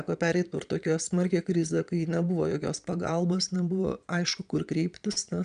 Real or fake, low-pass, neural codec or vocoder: fake; 9.9 kHz; vocoder, 22.05 kHz, 80 mel bands, Vocos